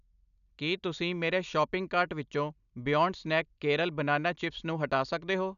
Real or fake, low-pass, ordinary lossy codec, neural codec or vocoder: real; 7.2 kHz; none; none